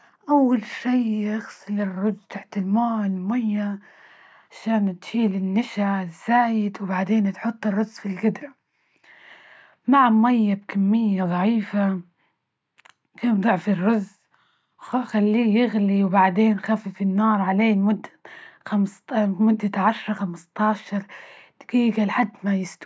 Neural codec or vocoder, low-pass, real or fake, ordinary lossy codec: none; none; real; none